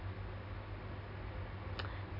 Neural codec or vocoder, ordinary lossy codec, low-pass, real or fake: none; none; 5.4 kHz; real